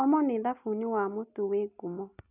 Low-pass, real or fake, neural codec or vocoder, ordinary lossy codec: 3.6 kHz; real; none; none